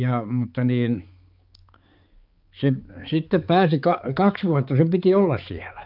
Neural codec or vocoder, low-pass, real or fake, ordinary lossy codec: codec, 16 kHz, 4 kbps, X-Codec, HuBERT features, trained on balanced general audio; 5.4 kHz; fake; Opus, 24 kbps